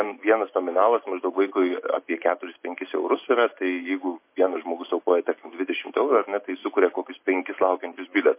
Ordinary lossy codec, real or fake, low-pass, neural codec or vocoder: MP3, 24 kbps; real; 3.6 kHz; none